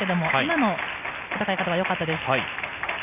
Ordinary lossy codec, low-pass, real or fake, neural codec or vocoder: none; 3.6 kHz; real; none